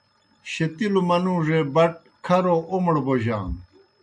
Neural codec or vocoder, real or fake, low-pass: none; real; 9.9 kHz